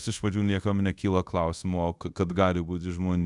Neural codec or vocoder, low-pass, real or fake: codec, 24 kHz, 0.5 kbps, DualCodec; 10.8 kHz; fake